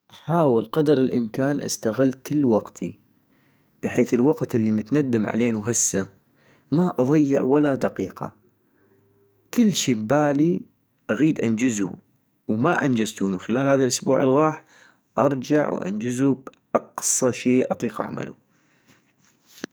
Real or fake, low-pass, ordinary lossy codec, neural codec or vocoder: fake; none; none; codec, 44.1 kHz, 2.6 kbps, SNAC